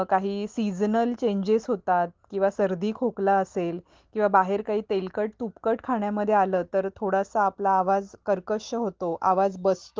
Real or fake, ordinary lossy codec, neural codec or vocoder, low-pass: real; Opus, 16 kbps; none; 7.2 kHz